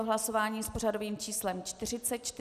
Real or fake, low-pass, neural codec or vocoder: real; 14.4 kHz; none